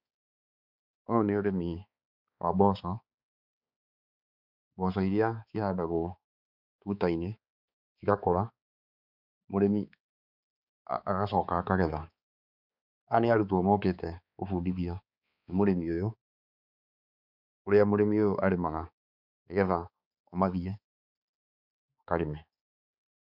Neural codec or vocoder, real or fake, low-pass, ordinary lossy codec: codec, 16 kHz, 4 kbps, X-Codec, HuBERT features, trained on balanced general audio; fake; 5.4 kHz; none